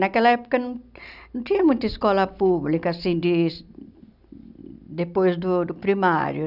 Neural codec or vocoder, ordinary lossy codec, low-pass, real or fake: none; none; 5.4 kHz; real